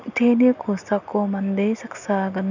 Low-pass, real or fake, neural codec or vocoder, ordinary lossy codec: 7.2 kHz; real; none; none